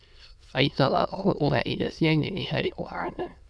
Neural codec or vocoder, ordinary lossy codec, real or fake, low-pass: autoencoder, 22.05 kHz, a latent of 192 numbers a frame, VITS, trained on many speakers; none; fake; none